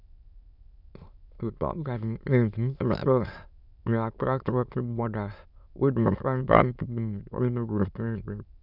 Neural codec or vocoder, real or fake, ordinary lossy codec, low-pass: autoencoder, 22.05 kHz, a latent of 192 numbers a frame, VITS, trained on many speakers; fake; none; 5.4 kHz